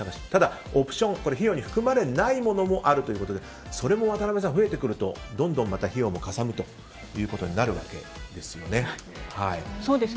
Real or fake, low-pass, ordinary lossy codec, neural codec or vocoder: real; none; none; none